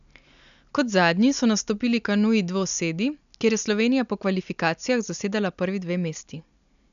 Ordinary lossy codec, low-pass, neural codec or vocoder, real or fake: none; 7.2 kHz; none; real